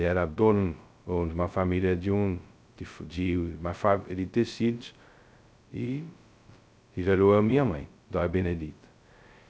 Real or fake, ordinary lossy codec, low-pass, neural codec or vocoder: fake; none; none; codec, 16 kHz, 0.2 kbps, FocalCodec